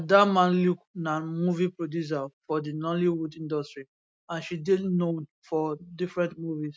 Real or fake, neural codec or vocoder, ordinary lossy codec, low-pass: real; none; none; none